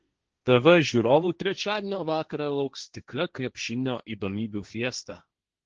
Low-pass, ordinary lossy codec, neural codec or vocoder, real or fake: 7.2 kHz; Opus, 16 kbps; codec, 16 kHz, 1.1 kbps, Voila-Tokenizer; fake